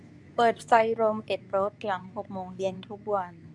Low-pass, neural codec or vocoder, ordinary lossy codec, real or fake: none; codec, 24 kHz, 0.9 kbps, WavTokenizer, medium speech release version 2; none; fake